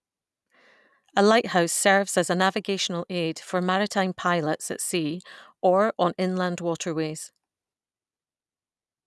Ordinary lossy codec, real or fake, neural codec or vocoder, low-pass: none; real; none; none